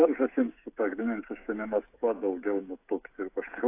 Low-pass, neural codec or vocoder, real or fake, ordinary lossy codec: 3.6 kHz; codec, 16 kHz, 16 kbps, FreqCodec, smaller model; fake; AAC, 24 kbps